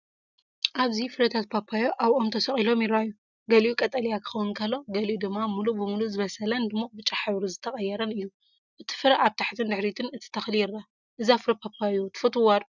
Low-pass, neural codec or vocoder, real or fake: 7.2 kHz; none; real